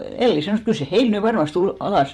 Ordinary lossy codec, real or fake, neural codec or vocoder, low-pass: MP3, 64 kbps; fake; vocoder, 44.1 kHz, 128 mel bands every 512 samples, BigVGAN v2; 19.8 kHz